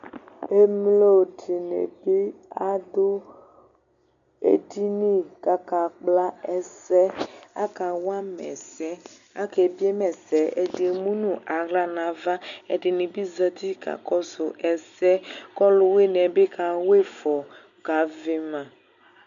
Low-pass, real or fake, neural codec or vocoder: 7.2 kHz; real; none